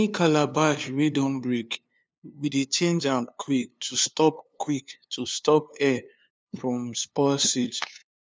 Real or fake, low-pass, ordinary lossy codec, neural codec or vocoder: fake; none; none; codec, 16 kHz, 2 kbps, FunCodec, trained on LibriTTS, 25 frames a second